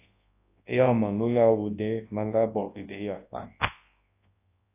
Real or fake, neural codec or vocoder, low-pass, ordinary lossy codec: fake; codec, 24 kHz, 0.9 kbps, WavTokenizer, large speech release; 3.6 kHz; AAC, 24 kbps